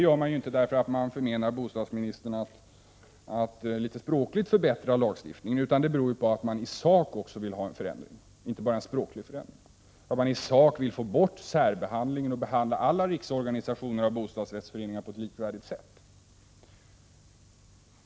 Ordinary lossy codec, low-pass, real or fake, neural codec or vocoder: none; none; real; none